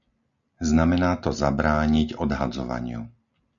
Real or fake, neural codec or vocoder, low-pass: real; none; 7.2 kHz